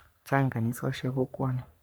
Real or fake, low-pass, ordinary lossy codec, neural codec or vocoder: fake; none; none; codec, 44.1 kHz, 3.4 kbps, Pupu-Codec